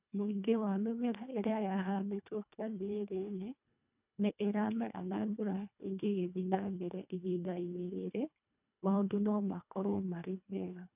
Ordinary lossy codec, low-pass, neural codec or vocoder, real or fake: none; 3.6 kHz; codec, 24 kHz, 1.5 kbps, HILCodec; fake